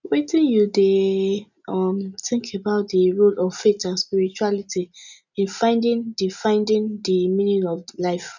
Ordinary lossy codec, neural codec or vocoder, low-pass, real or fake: none; none; 7.2 kHz; real